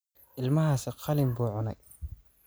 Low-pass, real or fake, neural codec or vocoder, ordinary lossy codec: none; real; none; none